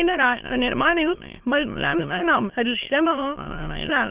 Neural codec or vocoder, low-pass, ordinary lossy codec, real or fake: autoencoder, 22.05 kHz, a latent of 192 numbers a frame, VITS, trained on many speakers; 3.6 kHz; Opus, 16 kbps; fake